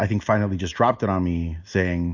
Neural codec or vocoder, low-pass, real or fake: none; 7.2 kHz; real